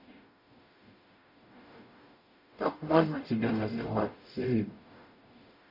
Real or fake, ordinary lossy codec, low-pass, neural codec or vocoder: fake; none; 5.4 kHz; codec, 44.1 kHz, 0.9 kbps, DAC